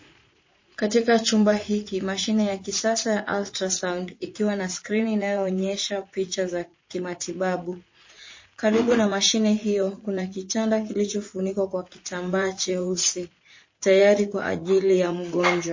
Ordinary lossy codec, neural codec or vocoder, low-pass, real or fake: MP3, 32 kbps; vocoder, 44.1 kHz, 80 mel bands, Vocos; 7.2 kHz; fake